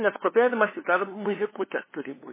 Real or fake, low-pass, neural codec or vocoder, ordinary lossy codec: fake; 3.6 kHz; codec, 16 kHz, 2 kbps, FunCodec, trained on LibriTTS, 25 frames a second; MP3, 16 kbps